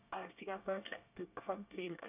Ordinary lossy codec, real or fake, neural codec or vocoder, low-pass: none; fake; codec, 24 kHz, 1 kbps, SNAC; 3.6 kHz